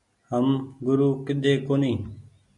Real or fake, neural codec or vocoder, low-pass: real; none; 10.8 kHz